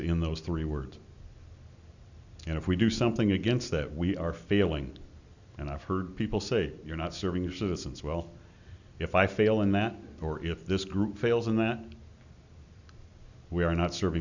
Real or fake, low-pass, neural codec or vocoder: real; 7.2 kHz; none